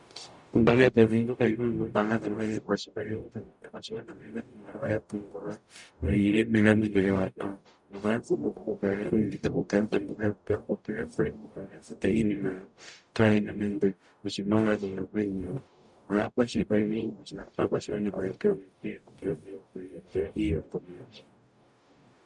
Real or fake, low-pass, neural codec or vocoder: fake; 10.8 kHz; codec, 44.1 kHz, 0.9 kbps, DAC